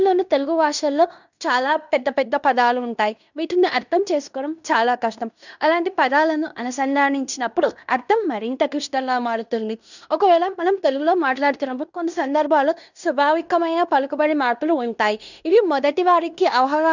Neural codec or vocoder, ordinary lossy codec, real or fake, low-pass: codec, 16 kHz in and 24 kHz out, 0.9 kbps, LongCat-Audio-Codec, fine tuned four codebook decoder; none; fake; 7.2 kHz